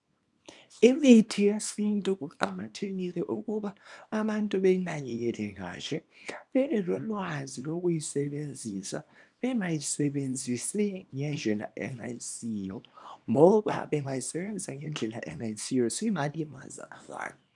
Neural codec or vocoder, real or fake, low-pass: codec, 24 kHz, 0.9 kbps, WavTokenizer, small release; fake; 10.8 kHz